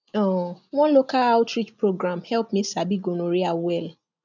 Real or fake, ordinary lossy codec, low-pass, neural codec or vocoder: real; none; 7.2 kHz; none